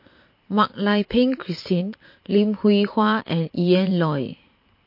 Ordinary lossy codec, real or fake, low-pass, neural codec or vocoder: MP3, 32 kbps; fake; 5.4 kHz; vocoder, 44.1 kHz, 128 mel bands every 512 samples, BigVGAN v2